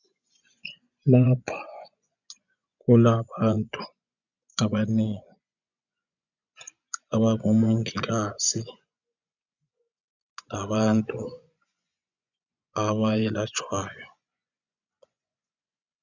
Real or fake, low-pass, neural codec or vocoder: fake; 7.2 kHz; vocoder, 24 kHz, 100 mel bands, Vocos